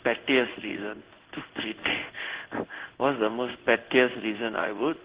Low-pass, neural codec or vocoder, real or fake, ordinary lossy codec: 3.6 kHz; codec, 16 kHz in and 24 kHz out, 1 kbps, XY-Tokenizer; fake; Opus, 16 kbps